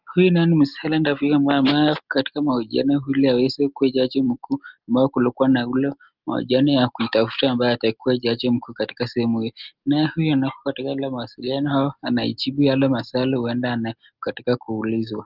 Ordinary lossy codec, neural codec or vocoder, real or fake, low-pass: Opus, 24 kbps; none; real; 5.4 kHz